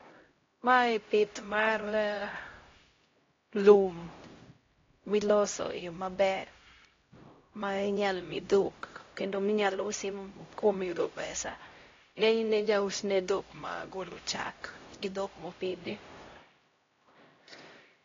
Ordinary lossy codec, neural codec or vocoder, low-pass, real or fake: AAC, 32 kbps; codec, 16 kHz, 0.5 kbps, X-Codec, HuBERT features, trained on LibriSpeech; 7.2 kHz; fake